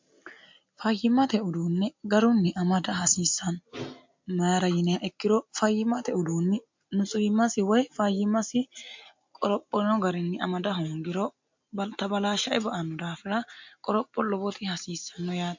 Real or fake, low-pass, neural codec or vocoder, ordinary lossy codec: real; 7.2 kHz; none; MP3, 48 kbps